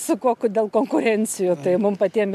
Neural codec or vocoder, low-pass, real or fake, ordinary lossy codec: none; 14.4 kHz; real; MP3, 96 kbps